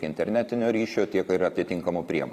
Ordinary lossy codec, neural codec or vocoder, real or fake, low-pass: Opus, 64 kbps; vocoder, 44.1 kHz, 128 mel bands every 512 samples, BigVGAN v2; fake; 14.4 kHz